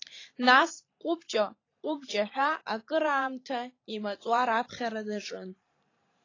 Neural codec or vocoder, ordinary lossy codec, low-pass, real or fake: vocoder, 44.1 kHz, 128 mel bands every 512 samples, BigVGAN v2; AAC, 32 kbps; 7.2 kHz; fake